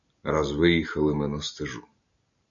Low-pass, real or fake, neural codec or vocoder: 7.2 kHz; real; none